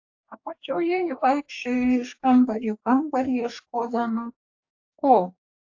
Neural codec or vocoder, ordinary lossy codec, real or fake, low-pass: codec, 44.1 kHz, 2.6 kbps, DAC; AAC, 48 kbps; fake; 7.2 kHz